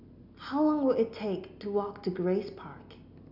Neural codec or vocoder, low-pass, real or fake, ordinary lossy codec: none; 5.4 kHz; real; none